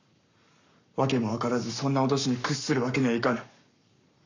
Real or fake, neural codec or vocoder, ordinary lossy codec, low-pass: fake; codec, 44.1 kHz, 7.8 kbps, Pupu-Codec; none; 7.2 kHz